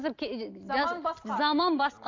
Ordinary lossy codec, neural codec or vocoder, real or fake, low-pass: AAC, 48 kbps; none; real; 7.2 kHz